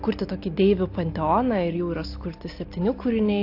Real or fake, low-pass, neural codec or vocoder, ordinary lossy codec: real; 5.4 kHz; none; AAC, 32 kbps